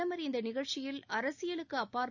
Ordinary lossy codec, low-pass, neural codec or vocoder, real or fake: none; 7.2 kHz; none; real